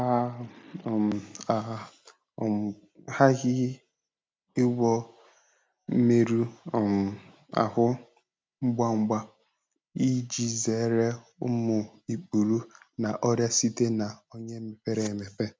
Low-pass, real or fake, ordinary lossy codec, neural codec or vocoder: none; real; none; none